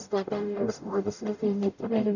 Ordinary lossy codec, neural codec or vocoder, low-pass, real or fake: none; codec, 44.1 kHz, 0.9 kbps, DAC; 7.2 kHz; fake